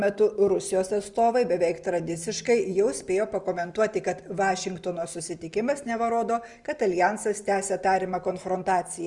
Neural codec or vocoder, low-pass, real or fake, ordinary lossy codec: none; 10.8 kHz; real; Opus, 32 kbps